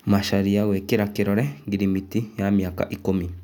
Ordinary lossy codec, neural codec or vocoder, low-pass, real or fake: none; none; 19.8 kHz; real